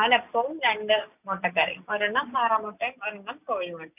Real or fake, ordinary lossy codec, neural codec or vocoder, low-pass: real; none; none; 3.6 kHz